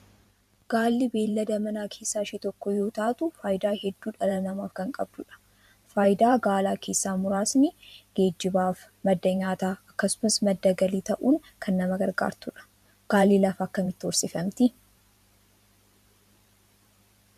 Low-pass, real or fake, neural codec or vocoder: 14.4 kHz; fake; vocoder, 44.1 kHz, 128 mel bands every 512 samples, BigVGAN v2